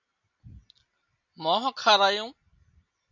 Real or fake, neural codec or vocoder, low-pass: real; none; 7.2 kHz